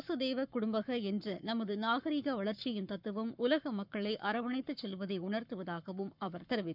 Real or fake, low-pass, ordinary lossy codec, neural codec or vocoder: fake; 5.4 kHz; none; codec, 44.1 kHz, 7.8 kbps, Pupu-Codec